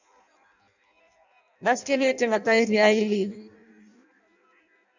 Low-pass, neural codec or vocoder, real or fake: 7.2 kHz; codec, 16 kHz in and 24 kHz out, 0.6 kbps, FireRedTTS-2 codec; fake